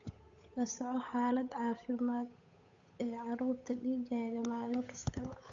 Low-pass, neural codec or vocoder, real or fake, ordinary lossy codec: 7.2 kHz; codec, 16 kHz, 8 kbps, FunCodec, trained on Chinese and English, 25 frames a second; fake; AAC, 48 kbps